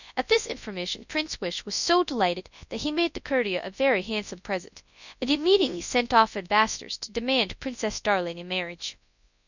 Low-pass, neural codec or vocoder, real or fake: 7.2 kHz; codec, 24 kHz, 0.9 kbps, WavTokenizer, large speech release; fake